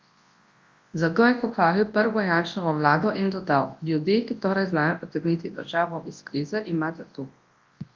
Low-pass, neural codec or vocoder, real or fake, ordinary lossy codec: 7.2 kHz; codec, 24 kHz, 0.9 kbps, WavTokenizer, large speech release; fake; Opus, 32 kbps